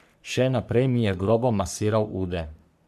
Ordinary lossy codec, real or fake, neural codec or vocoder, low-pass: MP3, 96 kbps; fake; codec, 44.1 kHz, 3.4 kbps, Pupu-Codec; 14.4 kHz